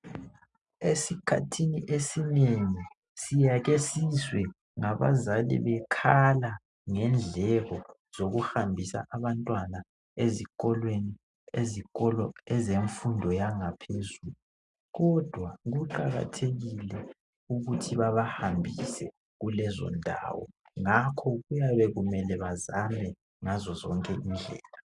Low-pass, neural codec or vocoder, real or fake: 10.8 kHz; none; real